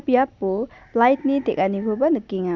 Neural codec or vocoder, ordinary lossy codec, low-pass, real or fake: none; none; 7.2 kHz; real